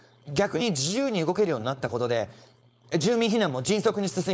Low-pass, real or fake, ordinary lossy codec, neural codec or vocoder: none; fake; none; codec, 16 kHz, 4.8 kbps, FACodec